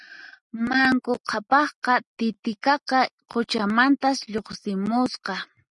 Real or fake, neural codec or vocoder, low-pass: real; none; 10.8 kHz